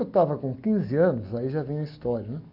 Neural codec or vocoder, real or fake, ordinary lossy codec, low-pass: none; real; none; 5.4 kHz